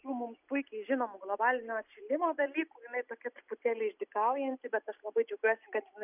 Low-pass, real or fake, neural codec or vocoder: 3.6 kHz; real; none